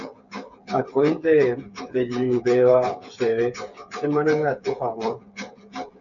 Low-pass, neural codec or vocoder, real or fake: 7.2 kHz; codec, 16 kHz, 8 kbps, FreqCodec, smaller model; fake